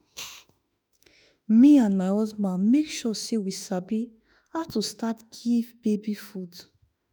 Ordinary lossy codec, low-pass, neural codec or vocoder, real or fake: none; none; autoencoder, 48 kHz, 32 numbers a frame, DAC-VAE, trained on Japanese speech; fake